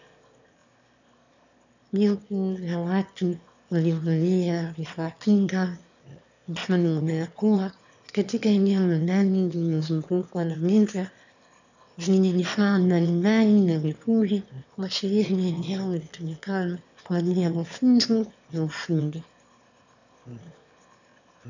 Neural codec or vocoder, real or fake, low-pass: autoencoder, 22.05 kHz, a latent of 192 numbers a frame, VITS, trained on one speaker; fake; 7.2 kHz